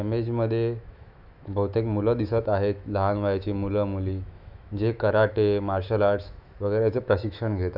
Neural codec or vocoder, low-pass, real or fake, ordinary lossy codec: autoencoder, 48 kHz, 128 numbers a frame, DAC-VAE, trained on Japanese speech; 5.4 kHz; fake; none